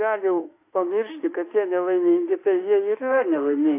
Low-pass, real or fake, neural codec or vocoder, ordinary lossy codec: 3.6 kHz; fake; autoencoder, 48 kHz, 32 numbers a frame, DAC-VAE, trained on Japanese speech; AAC, 24 kbps